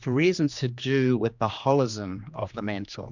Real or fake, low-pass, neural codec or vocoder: fake; 7.2 kHz; codec, 16 kHz, 2 kbps, X-Codec, HuBERT features, trained on general audio